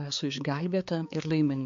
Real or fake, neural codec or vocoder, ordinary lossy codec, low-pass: fake; codec, 16 kHz, 4 kbps, X-Codec, HuBERT features, trained on balanced general audio; MP3, 48 kbps; 7.2 kHz